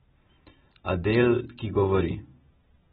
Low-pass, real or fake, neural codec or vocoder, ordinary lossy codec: 19.8 kHz; real; none; AAC, 16 kbps